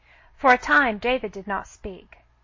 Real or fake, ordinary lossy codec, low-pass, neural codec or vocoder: real; MP3, 32 kbps; 7.2 kHz; none